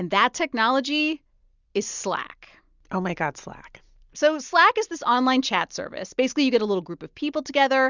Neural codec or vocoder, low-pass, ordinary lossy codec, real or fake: none; 7.2 kHz; Opus, 64 kbps; real